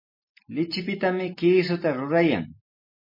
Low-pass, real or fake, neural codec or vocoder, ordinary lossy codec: 5.4 kHz; real; none; MP3, 24 kbps